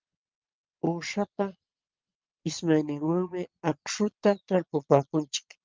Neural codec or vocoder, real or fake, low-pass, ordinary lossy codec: vocoder, 22.05 kHz, 80 mel bands, Vocos; fake; 7.2 kHz; Opus, 32 kbps